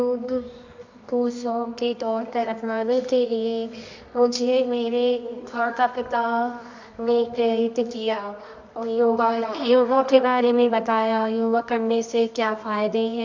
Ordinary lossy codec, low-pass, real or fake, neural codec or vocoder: MP3, 64 kbps; 7.2 kHz; fake; codec, 24 kHz, 0.9 kbps, WavTokenizer, medium music audio release